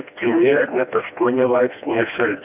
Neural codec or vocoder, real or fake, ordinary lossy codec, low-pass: codec, 16 kHz, 1 kbps, FreqCodec, smaller model; fake; AAC, 32 kbps; 3.6 kHz